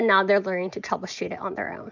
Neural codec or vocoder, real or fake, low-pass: none; real; 7.2 kHz